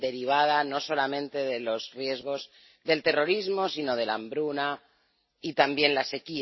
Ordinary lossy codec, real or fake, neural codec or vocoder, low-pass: MP3, 24 kbps; real; none; 7.2 kHz